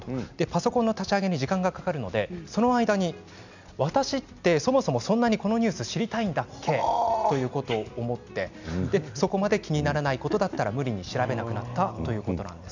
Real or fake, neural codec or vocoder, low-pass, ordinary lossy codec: real; none; 7.2 kHz; none